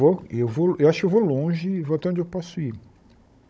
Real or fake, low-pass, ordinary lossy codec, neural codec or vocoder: fake; none; none; codec, 16 kHz, 16 kbps, FunCodec, trained on Chinese and English, 50 frames a second